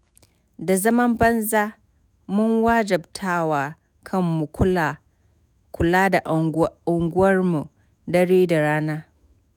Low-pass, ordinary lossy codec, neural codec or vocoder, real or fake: none; none; autoencoder, 48 kHz, 128 numbers a frame, DAC-VAE, trained on Japanese speech; fake